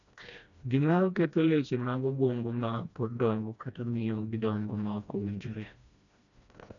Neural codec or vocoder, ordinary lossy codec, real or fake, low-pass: codec, 16 kHz, 1 kbps, FreqCodec, smaller model; none; fake; 7.2 kHz